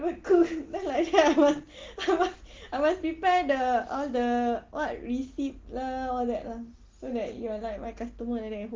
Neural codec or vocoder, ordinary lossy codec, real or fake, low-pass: none; Opus, 16 kbps; real; 7.2 kHz